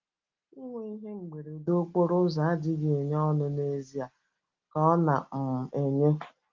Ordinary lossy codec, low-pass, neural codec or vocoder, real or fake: Opus, 32 kbps; 7.2 kHz; none; real